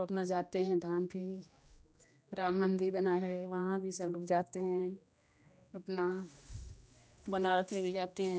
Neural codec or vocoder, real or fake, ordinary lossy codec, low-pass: codec, 16 kHz, 1 kbps, X-Codec, HuBERT features, trained on general audio; fake; none; none